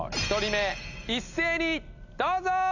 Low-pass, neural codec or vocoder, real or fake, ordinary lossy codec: 7.2 kHz; none; real; none